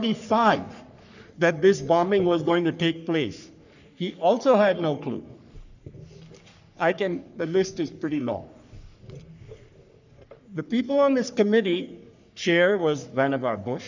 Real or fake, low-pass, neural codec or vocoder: fake; 7.2 kHz; codec, 44.1 kHz, 3.4 kbps, Pupu-Codec